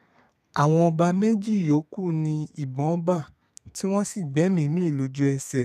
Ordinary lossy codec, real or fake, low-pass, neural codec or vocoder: none; fake; 14.4 kHz; codec, 32 kHz, 1.9 kbps, SNAC